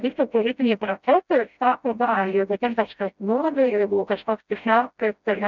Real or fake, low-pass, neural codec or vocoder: fake; 7.2 kHz; codec, 16 kHz, 0.5 kbps, FreqCodec, smaller model